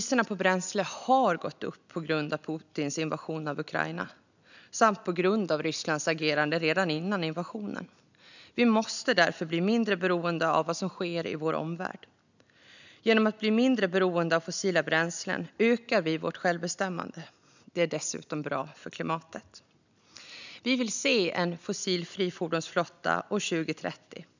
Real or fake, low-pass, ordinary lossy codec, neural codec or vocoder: real; 7.2 kHz; none; none